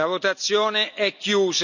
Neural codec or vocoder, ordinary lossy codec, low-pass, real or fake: none; none; 7.2 kHz; real